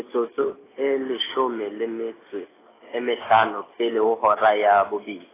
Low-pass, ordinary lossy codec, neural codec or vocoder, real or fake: 3.6 kHz; AAC, 16 kbps; none; real